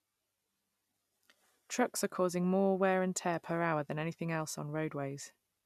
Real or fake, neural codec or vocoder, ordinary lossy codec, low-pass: real; none; none; 14.4 kHz